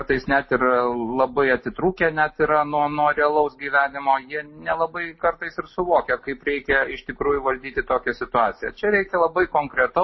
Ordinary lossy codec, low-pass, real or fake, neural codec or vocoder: MP3, 24 kbps; 7.2 kHz; real; none